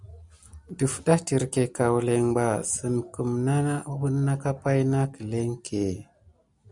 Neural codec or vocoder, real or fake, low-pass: none; real; 10.8 kHz